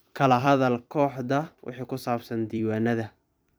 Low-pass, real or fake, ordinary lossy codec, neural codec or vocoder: none; fake; none; vocoder, 44.1 kHz, 128 mel bands every 256 samples, BigVGAN v2